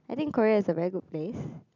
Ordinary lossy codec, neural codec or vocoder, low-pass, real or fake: none; none; 7.2 kHz; real